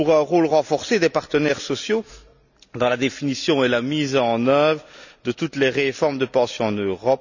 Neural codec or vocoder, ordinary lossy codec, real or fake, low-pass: none; none; real; 7.2 kHz